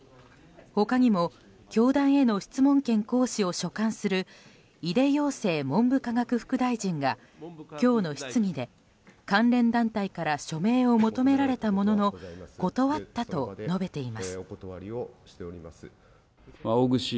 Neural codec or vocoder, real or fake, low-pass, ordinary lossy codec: none; real; none; none